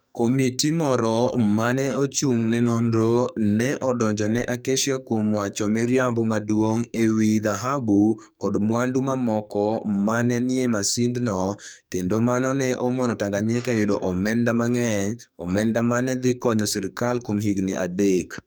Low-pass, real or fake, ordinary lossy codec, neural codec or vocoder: none; fake; none; codec, 44.1 kHz, 2.6 kbps, SNAC